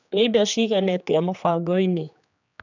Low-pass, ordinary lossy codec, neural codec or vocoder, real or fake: 7.2 kHz; none; codec, 16 kHz, 2 kbps, X-Codec, HuBERT features, trained on general audio; fake